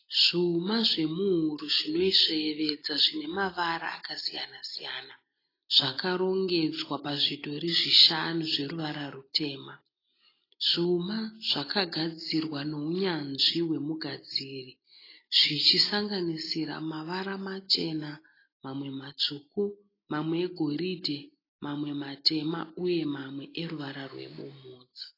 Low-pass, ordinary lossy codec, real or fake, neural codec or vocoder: 5.4 kHz; AAC, 24 kbps; real; none